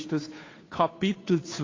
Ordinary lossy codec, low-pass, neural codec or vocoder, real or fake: AAC, 32 kbps; 7.2 kHz; codec, 16 kHz, 2 kbps, FunCodec, trained on Chinese and English, 25 frames a second; fake